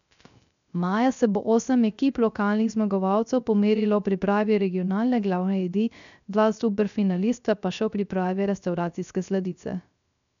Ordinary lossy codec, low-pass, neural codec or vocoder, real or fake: none; 7.2 kHz; codec, 16 kHz, 0.3 kbps, FocalCodec; fake